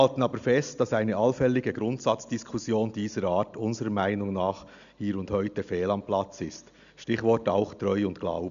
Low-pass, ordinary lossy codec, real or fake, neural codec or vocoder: 7.2 kHz; none; real; none